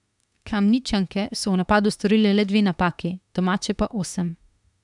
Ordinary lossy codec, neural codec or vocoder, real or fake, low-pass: none; autoencoder, 48 kHz, 32 numbers a frame, DAC-VAE, trained on Japanese speech; fake; 10.8 kHz